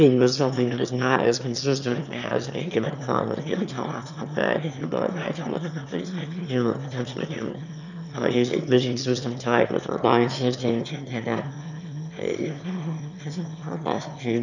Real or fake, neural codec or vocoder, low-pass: fake; autoencoder, 22.05 kHz, a latent of 192 numbers a frame, VITS, trained on one speaker; 7.2 kHz